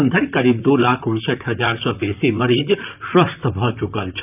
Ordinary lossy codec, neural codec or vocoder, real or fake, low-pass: none; vocoder, 44.1 kHz, 128 mel bands, Pupu-Vocoder; fake; 3.6 kHz